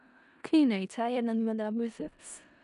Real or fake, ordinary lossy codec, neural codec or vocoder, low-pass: fake; none; codec, 16 kHz in and 24 kHz out, 0.4 kbps, LongCat-Audio-Codec, four codebook decoder; 10.8 kHz